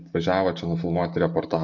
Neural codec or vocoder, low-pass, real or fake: none; 7.2 kHz; real